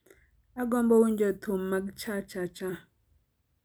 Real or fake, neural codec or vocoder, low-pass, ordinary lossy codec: real; none; none; none